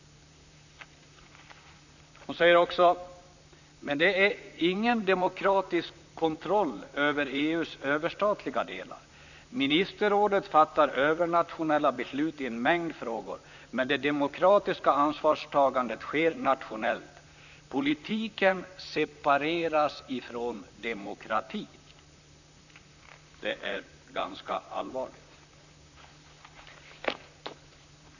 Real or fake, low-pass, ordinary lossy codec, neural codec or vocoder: fake; 7.2 kHz; none; vocoder, 44.1 kHz, 128 mel bands, Pupu-Vocoder